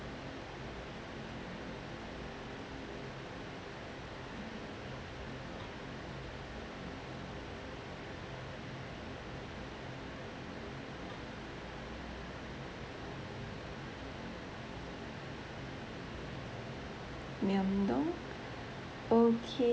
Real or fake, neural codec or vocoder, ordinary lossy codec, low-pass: real; none; none; none